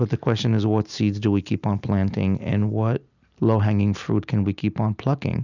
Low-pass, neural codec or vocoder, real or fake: 7.2 kHz; none; real